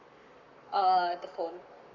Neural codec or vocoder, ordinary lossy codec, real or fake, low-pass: codec, 44.1 kHz, 7.8 kbps, Pupu-Codec; none; fake; 7.2 kHz